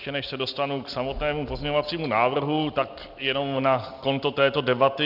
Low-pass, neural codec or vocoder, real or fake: 5.4 kHz; none; real